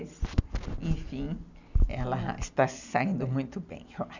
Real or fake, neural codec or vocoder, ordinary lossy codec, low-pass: real; none; none; 7.2 kHz